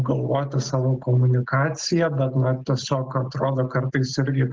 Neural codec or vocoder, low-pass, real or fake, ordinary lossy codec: none; 7.2 kHz; real; Opus, 16 kbps